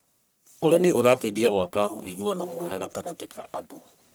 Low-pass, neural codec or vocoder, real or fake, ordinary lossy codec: none; codec, 44.1 kHz, 1.7 kbps, Pupu-Codec; fake; none